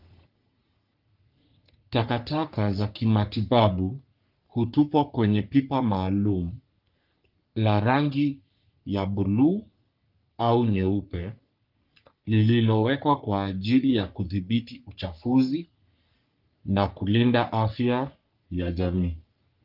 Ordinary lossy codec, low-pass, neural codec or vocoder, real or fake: Opus, 24 kbps; 5.4 kHz; codec, 44.1 kHz, 3.4 kbps, Pupu-Codec; fake